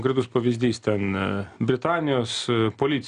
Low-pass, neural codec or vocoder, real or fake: 9.9 kHz; none; real